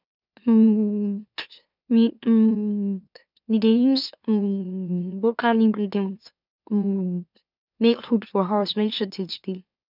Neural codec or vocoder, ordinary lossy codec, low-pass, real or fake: autoencoder, 44.1 kHz, a latent of 192 numbers a frame, MeloTTS; none; 5.4 kHz; fake